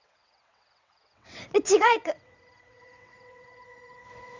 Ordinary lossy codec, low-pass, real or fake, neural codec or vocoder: none; 7.2 kHz; fake; vocoder, 22.05 kHz, 80 mel bands, WaveNeXt